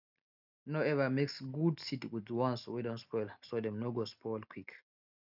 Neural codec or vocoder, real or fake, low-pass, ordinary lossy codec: none; real; 5.4 kHz; none